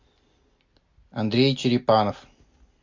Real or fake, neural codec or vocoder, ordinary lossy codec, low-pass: real; none; MP3, 48 kbps; 7.2 kHz